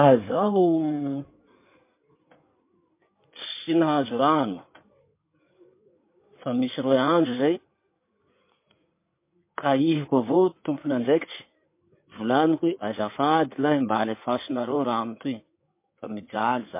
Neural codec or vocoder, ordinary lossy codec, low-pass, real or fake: codec, 16 kHz in and 24 kHz out, 2.2 kbps, FireRedTTS-2 codec; MP3, 24 kbps; 3.6 kHz; fake